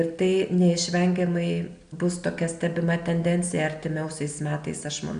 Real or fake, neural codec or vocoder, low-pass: real; none; 9.9 kHz